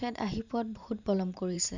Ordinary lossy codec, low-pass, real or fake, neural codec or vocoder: none; 7.2 kHz; fake; vocoder, 44.1 kHz, 128 mel bands every 512 samples, BigVGAN v2